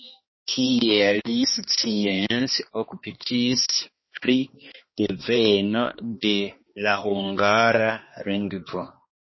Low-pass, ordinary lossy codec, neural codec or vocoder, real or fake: 7.2 kHz; MP3, 24 kbps; codec, 16 kHz, 2 kbps, X-Codec, HuBERT features, trained on balanced general audio; fake